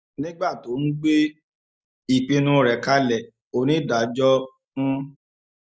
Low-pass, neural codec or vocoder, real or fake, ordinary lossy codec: none; none; real; none